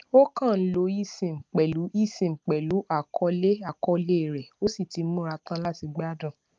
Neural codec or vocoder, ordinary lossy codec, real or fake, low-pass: none; Opus, 24 kbps; real; 7.2 kHz